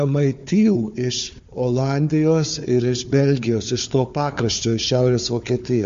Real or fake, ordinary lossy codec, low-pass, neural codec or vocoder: fake; MP3, 48 kbps; 7.2 kHz; codec, 16 kHz, 4 kbps, FunCodec, trained on Chinese and English, 50 frames a second